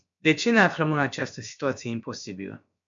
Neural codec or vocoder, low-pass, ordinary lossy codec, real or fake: codec, 16 kHz, about 1 kbps, DyCAST, with the encoder's durations; 7.2 kHz; MP3, 64 kbps; fake